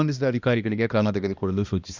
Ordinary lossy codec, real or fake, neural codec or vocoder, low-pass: Opus, 64 kbps; fake; codec, 16 kHz, 1 kbps, X-Codec, HuBERT features, trained on balanced general audio; 7.2 kHz